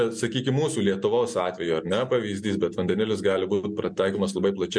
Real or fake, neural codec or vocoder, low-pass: real; none; 9.9 kHz